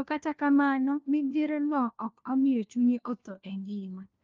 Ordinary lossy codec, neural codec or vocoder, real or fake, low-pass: Opus, 32 kbps; codec, 16 kHz, 1 kbps, FunCodec, trained on LibriTTS, 50 frames a second; fake; 7.2 kHz